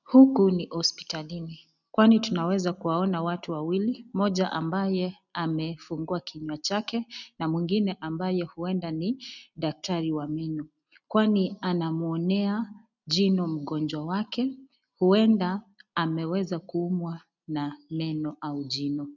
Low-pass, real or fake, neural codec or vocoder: 7.2 kHz; real; none